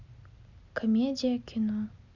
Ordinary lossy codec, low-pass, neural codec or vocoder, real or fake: none; 7.2 kHz; none; real